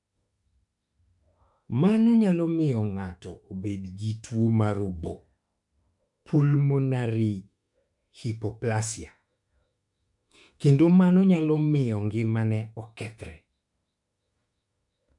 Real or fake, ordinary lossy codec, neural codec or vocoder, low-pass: fake; MP3, 64 kbps; autoencoder, 48 kHz, 32 numbers a frame, DAC-VAE, trained on Japanese speech; 10.8 kHz